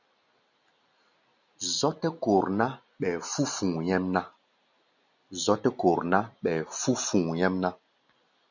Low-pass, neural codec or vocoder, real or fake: 7.2 kHz; none; real